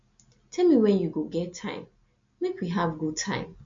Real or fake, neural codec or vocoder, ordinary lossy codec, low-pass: real; none; MP3, 48 kbps; 7.2 kHz